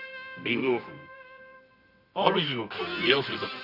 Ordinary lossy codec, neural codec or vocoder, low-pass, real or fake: none; codec, 24 kHz, 0.9 kbps, WavTokenizer, medium music audio release; 5.4 kHz; fake